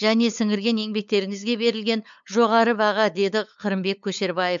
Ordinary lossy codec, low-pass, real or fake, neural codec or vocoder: MP3, 64 kbps; 7.2 kHz; fake; codec, 16 kHz, 8 kbps, FunCodec, trained on LibriTTS, 25 frames a second